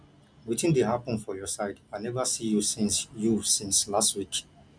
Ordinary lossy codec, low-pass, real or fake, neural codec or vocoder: none; 9.9 kHz; real; none